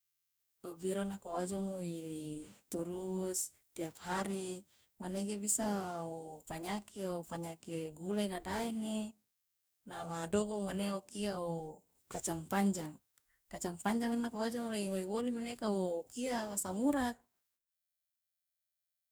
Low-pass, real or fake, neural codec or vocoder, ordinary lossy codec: none; fake; codec, 44.1 kHz, 2.6 kbps, DAC; none